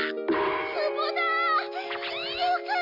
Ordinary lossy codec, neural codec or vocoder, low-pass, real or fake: none; none; 5.4 kHz; real